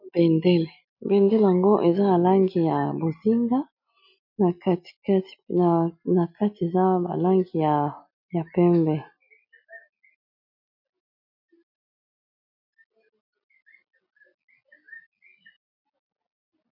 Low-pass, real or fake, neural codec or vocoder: 5.4 kHz; real; none